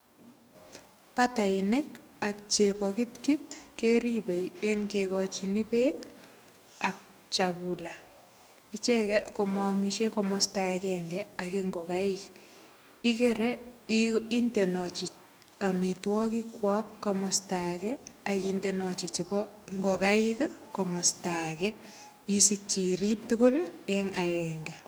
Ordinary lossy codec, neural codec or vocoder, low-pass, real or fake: none; codec, 44.1 kHz, 2.6 kbps, DAC; none; fake